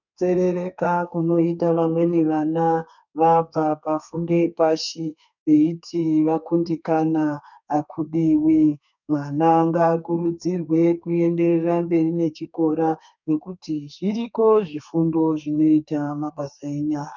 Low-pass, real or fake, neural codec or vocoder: 7.2 kHz; fake; codec, 32 kHz, 1.9 kbps, SNAC